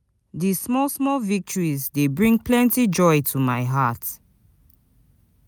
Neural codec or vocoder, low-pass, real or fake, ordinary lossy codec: none; none; real; none